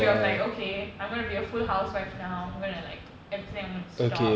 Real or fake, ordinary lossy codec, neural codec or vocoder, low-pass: real; none; none; none